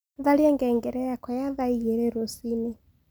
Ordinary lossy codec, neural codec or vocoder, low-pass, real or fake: none; none; none; real